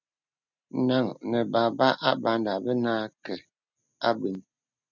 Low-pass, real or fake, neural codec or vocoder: 7.2 kHz; real; none